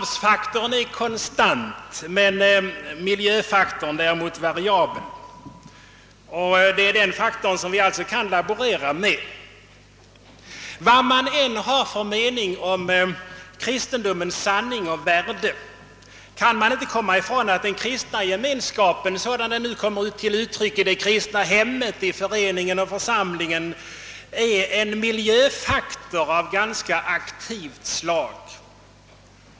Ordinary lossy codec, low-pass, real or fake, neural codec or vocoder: none; none; real; none